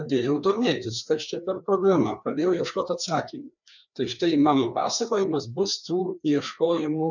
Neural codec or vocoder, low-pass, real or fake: codec, 16 kHz, 2 kbps, FreqCodec, larger model; 7.2 kHz; fake